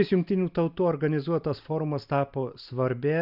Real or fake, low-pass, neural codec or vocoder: real; 5.4 kHz; none